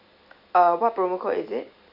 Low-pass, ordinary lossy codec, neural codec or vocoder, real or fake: 5.4 kHz; none; none; real